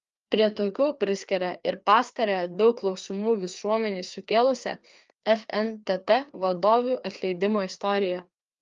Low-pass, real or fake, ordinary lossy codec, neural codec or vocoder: 7.2 kHz; fake; Opus, 32 kbps; codec, 16 kHz, 2 kbps, FreqCodec, larger model